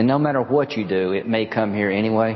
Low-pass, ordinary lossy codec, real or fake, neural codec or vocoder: 7.2 kHz; MP3, 24 kbps; real; none